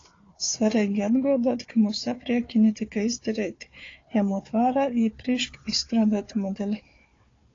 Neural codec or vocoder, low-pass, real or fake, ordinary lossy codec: codec, 16 kHz, 4 kbps, FunCodec, trained on Chinese and English, 50 frames a second; 7.2 kHz; fake; AAC, 32 kbps